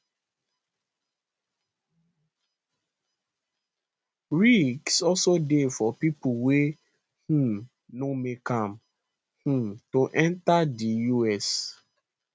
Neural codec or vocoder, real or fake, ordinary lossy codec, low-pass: none; real; none; none